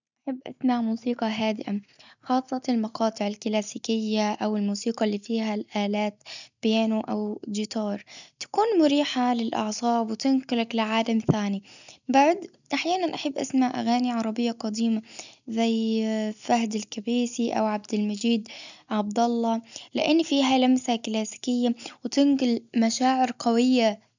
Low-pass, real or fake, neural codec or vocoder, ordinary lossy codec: 7.2 kHz; real; none; none